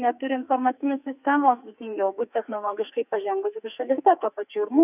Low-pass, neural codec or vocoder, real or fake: 3.6 kHz; codec, 44.1 kHz, 2.6 kbps, SNAC; fake